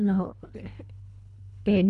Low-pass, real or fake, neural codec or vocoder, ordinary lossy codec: 10.8 kHz; fake; codec, 24 kHz, 3 kbps, HILCodec; MP3, 96 kbps